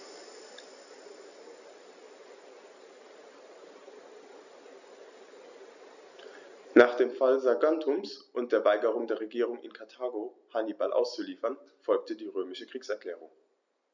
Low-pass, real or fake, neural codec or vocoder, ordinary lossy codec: 7.2 kHz; real; none; none